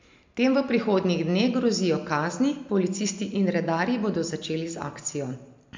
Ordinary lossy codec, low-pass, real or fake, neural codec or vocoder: MP3, 64 kbps; 7.2 kHz; real; none